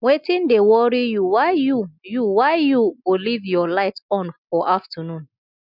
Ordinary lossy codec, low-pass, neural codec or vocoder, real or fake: none; 5.4 kHz; none; real